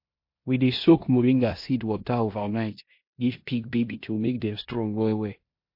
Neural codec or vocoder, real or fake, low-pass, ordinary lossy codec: codec, 16 kHz in and 24 kHz out, 0.9 kbps, LongCat-Audio-Codec, four codebook decoder; fake; 5.4 kHz; MP3, 32 kbps